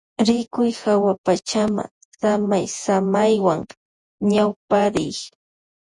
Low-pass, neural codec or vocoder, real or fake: 10.8 kHz; vocoder, 48 kHz, 128 mel bands, Vocos; fake